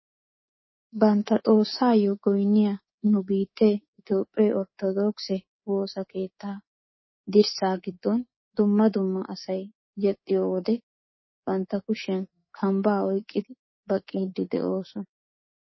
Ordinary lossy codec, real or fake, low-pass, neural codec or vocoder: MP3, 24 kbps; fake; 7.2 kHz; codec, 24 kHz, 3.1 kbps, DualCodec